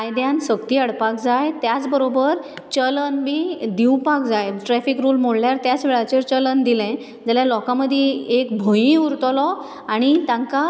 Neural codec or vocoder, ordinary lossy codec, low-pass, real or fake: none; none; none; real